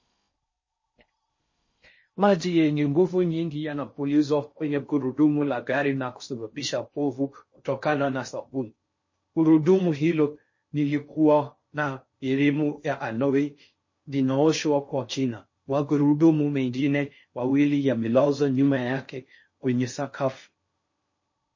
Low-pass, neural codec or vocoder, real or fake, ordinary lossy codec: 7.2 kHz; codec, 16 kHz in and 24 kHz out, 0.6 kbps, FocalCodec, streaming, 4096 codes; fake; MP3, 32 kbps